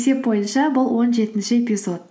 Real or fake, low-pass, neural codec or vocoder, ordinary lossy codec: real; none; none; none